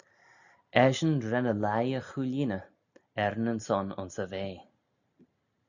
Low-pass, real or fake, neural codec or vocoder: 7.2 kHz; real; none